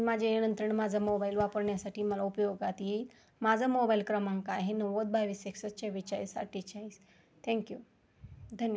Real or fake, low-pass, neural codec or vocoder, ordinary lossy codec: real; none; none; none